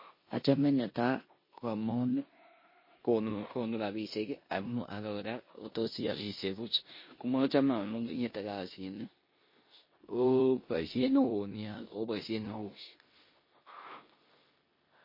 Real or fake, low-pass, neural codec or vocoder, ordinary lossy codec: fake; 5.4 kHz; codec, 16 kHz in and 24 kHz out, 0.9 kbps, LongCat-Audio-Codec, four codebook decoder; MP3, 24 kbps